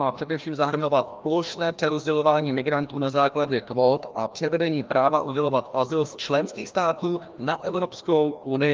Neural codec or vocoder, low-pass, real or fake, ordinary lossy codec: codec, 16 kHz, 1 kbps, FreqCodec, larger model; 7.2 kHz; fake; Opus, 24 kbps